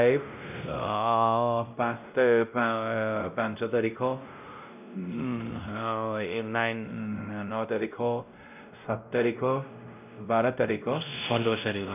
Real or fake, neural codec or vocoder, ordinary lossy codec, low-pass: fake; codec, 16 kHz, 0.5 kbps, X-Codec, WavLM features, trained on Multilingual LibriSpeech; none; 3.6 kHz